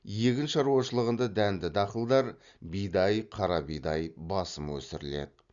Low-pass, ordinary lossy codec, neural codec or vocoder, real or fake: 7.2 kHz; Opus, 64 kbps; none; real